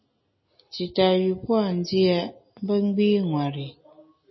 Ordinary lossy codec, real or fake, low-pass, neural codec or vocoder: MP3, 24 kbps; real; 7.2 kHz; none